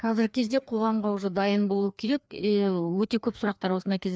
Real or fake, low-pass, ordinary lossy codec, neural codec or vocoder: fake; none; none; codec, 16 kHz, 2 kbps, FreqCodec, larger model